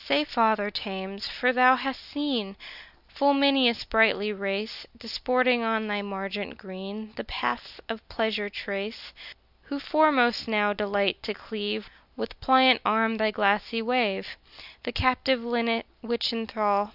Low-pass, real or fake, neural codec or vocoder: 5.4 kHz; real; none